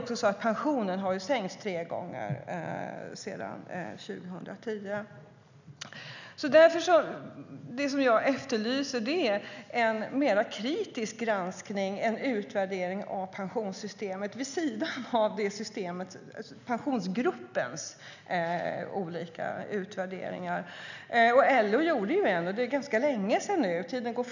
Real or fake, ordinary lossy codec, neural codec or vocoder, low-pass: real; none; none; 7.2 kHz